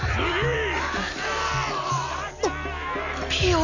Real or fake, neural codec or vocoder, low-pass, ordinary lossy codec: real; none; 7.2 kHz; none